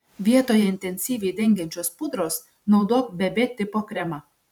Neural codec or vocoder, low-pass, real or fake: vocoder, 44.1 kHz, 128 mel bands every 512 samples, BigVGAN v2; 19.8 kHz; fake